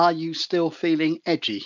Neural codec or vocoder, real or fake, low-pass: none; real; 7.2 kHz